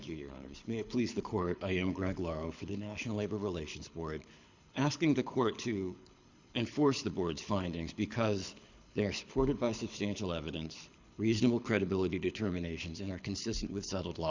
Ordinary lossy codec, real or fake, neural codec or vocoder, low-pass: Opus, 64 kbps; fake; codec, 24 kHz, 6 kbps, HILCodec; 7.2 kHz